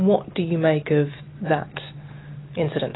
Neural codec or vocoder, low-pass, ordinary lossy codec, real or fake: none; 7.2 kHz; AAC, 16 kbps; real